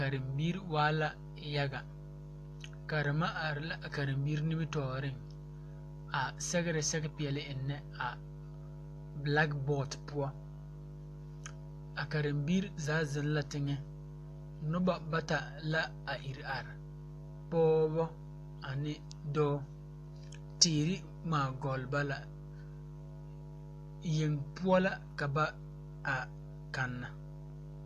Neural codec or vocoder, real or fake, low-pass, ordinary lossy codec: none; real; 14.4 kHz; AAC, 64 kbps